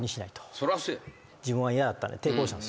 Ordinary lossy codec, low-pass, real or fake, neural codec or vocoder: none; none; real; none